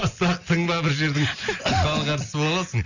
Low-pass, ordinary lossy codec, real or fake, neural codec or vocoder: 7.2 kHz; none; real; none